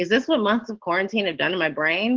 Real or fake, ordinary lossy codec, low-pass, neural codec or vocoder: real; Opus, 16 kbps; 7.2 kHz; none